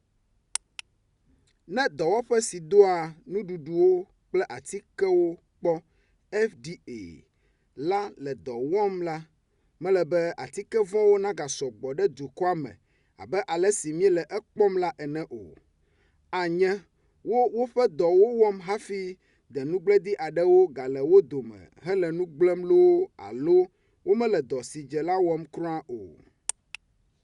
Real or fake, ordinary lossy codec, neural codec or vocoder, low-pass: real; Opus, 64 kbps; none; 10.8 kHz